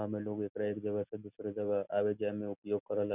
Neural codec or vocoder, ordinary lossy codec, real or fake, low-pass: none; none; real; 3.6 kHz